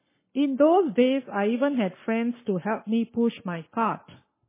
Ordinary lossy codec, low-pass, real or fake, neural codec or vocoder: MP3, 16 kbps; 3.6 kHz; fake; codec, 44.1 kHz, 7.8 kbps, Pupu-Codec